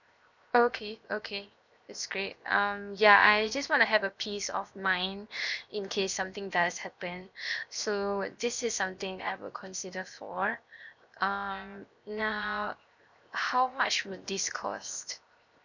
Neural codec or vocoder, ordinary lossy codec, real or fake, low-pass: codec, 16 kHz, 0.7 kbps, FocalCodec; none; fake; 7.2 kHz